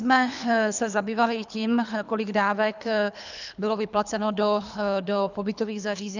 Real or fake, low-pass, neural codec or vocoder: fake; 7.2 kHz; codec, 24 kHz, 3 kbps, HILCodec